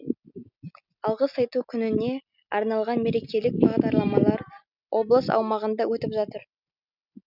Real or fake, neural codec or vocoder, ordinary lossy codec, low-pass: real; none; none; 5.4 kHz